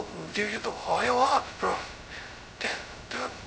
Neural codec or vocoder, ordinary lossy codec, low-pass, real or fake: codec, 16 kHz, 0.2 kbps, FocalCodec; none; none; fake